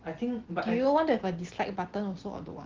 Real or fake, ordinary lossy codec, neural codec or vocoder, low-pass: real; Opus, 16 kbps; none; 7.2 kHz